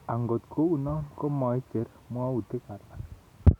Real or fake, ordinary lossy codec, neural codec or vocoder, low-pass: real; none; none; 19.8 kHz